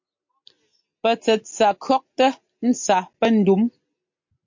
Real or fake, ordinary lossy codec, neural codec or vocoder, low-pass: real; MP3, 48 kbps; none; 7.2 kHz